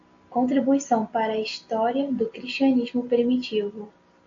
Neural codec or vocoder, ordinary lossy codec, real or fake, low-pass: none; AAC, 48 kbps; real; 7.2 kHz